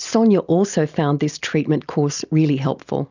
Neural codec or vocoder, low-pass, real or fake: none; 7.2 kHz; real